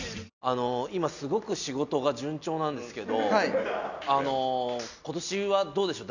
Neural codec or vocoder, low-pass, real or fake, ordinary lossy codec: none; 7.2 kHz; real; none